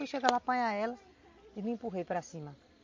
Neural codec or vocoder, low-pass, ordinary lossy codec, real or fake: none; 7.2 kHz; MP3, 48 kbps; real